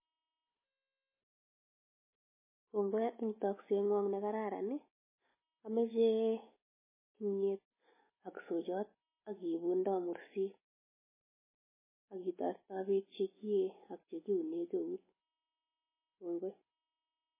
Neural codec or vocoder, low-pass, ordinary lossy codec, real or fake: none; 3.6 kHz; MP3, 16 kbps; real